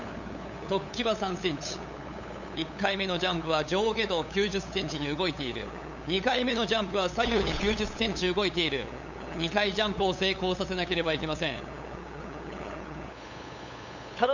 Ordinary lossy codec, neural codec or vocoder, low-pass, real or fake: none; codec, 16 kHz, 8 kbps, FunCodec, trained on LibriTTS, 25 frames a second; 7.2 kHz; fake